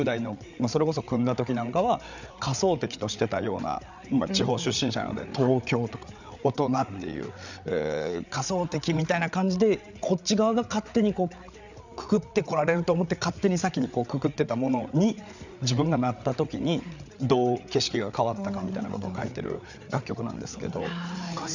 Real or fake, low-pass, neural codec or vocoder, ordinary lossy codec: fake; 7.2 kHz; codec, 16 kHz, 8 kbps, FreqCodec, larger model; none